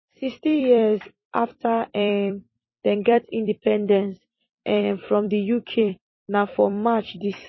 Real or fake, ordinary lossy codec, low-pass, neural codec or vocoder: fake; MP3, 24 kbps; 7.2 kHz; vocoder, 44.1 kHz, 128 mel bands every 256 samples, BigVGAN v2